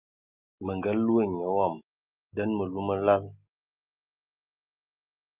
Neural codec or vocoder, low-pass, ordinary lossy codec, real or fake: none; 3.6 kHz; Opus, 24 kbps; real